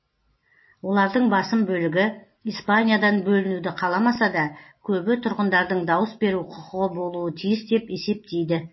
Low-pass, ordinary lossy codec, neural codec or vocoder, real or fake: 7.2 kHz; MP3, 24 kbps; none; real